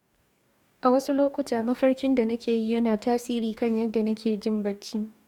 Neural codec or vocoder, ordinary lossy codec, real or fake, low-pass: codec, 44.1 kHz, 2.6 kbps, DAC; none; fake; 19.8 kHz